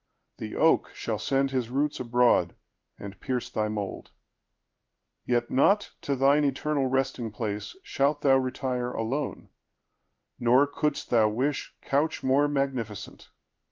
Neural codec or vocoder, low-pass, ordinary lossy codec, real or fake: codec, 16 kHz in and 24 kHz out, 1 kbps, XY-Tokenizer; 7.2 kHz; Opus, 32 kbps; fake